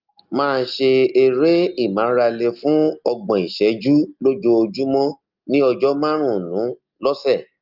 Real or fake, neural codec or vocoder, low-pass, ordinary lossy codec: real; none; 5.4 kHz; Opus, 24 kbps